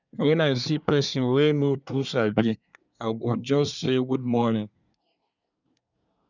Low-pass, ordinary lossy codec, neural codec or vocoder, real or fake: 7.2 kHz; none; codec, 24 kHz, 1 kbps, SNAC; fake